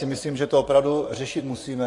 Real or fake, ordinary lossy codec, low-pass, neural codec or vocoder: fake; AAC, 48 kbps; 10.8 kHz; vocoder, 24 kHz, 100 mel bands, Vocos